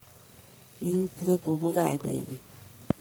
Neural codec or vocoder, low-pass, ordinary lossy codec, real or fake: codec, 44.1 kHz, 1.7 kbps, Pupu-Codec; none; none; fake